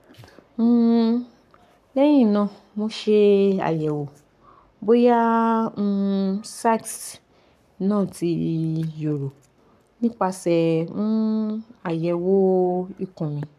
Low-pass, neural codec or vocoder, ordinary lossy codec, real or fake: 14.4 kHz; codec, 44.1 kHz, 7.8 kbps, Pupu-Codec; MP3, 96 kbps; fake